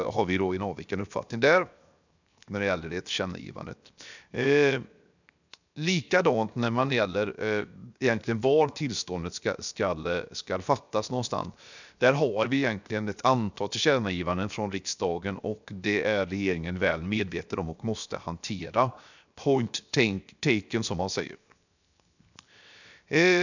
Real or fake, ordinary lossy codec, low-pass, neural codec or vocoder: fake; none; 7.2 kHz; codec, 16 kHz, 0.7 kbps, FocalCodec